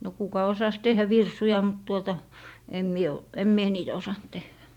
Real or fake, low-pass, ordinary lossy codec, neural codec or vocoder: fake; 19.8 kHz; none; vocoder, 44.1 kHz, 128 mel bands every 512 samples, BigVGAN v2